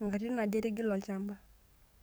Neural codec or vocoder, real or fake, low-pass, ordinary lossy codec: codec, 44.1 kHz, 7.8 kbps, DAC; fake; none; none